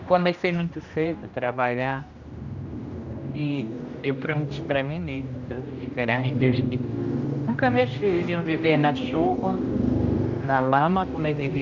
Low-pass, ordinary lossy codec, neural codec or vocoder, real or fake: 7.2 kHz; none; codec, 16 kHz, 1 kbps, X-Codec, HuBERT features, trained on general audio; fake